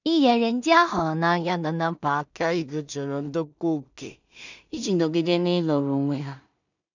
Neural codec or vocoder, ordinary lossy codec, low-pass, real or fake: codec, 16 kHz in and 24 kHz out, 0.4 kbps, LongCat-Audio-Codec, two codebook decoder; none; 7.2 kHz; fake